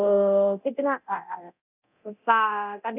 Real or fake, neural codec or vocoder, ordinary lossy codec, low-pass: fake; codec, 24 kHz, 0.9 kbps, DualCodec; none; 3.6 kHz